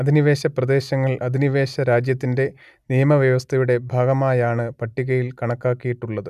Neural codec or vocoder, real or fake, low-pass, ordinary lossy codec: none; real; 14.4 kHz; none